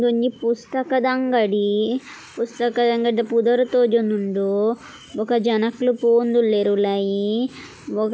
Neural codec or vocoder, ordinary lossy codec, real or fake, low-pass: none; none; real; none